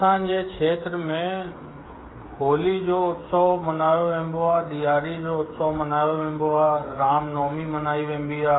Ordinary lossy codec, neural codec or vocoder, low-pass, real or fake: AAC, 16 kbps; codec, 16 kHz, 8 kbps, FreqCodec, smaller model; 7.2 kHz; fake